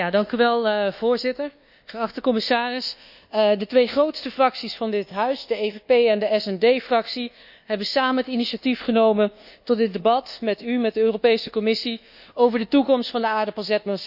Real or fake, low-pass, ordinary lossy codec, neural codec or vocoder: fake; 5.4 kHz; none; codec, 24 kHz, 1.2 kbps, DualCodec